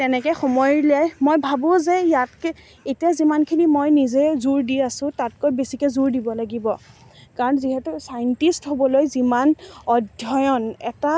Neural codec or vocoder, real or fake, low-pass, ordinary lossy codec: none; real; none; none